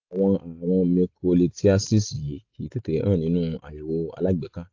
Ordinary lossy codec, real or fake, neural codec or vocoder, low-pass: AAC, 48 kbps; real; none; 7.2 kHz